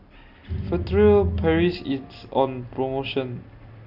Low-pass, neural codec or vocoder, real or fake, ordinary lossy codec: 5.4 kHz; none; real; none